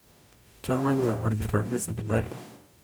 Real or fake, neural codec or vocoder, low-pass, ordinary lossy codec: fake; codec, 44.1 kHz, 0.9 kbps, DAC; none; none